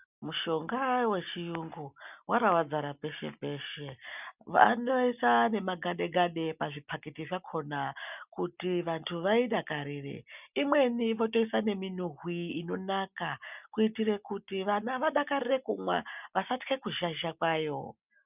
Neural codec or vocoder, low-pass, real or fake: none; 3.6 kHz; real